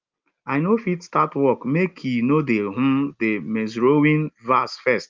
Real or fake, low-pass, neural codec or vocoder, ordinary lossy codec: real; 7.2 kHz; none; Opus, 32 kbps